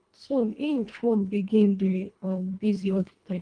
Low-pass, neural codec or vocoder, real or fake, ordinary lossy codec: 9.9 kHz; codec, 24 kHz, 1.5 kbps, HILCodec; fake; Opus, 32 kbps